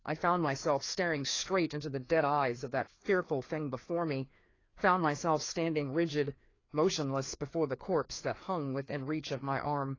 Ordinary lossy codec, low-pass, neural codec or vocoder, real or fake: AAC, 32 kbps; 7.2 kHz; codec, 16 kHz, 2 kbps, FreqCodec, larger model; fake